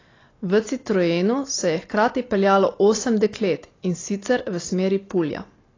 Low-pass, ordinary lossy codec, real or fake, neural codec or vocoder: 7.2 kHz; AAC, 32 kbps; real; none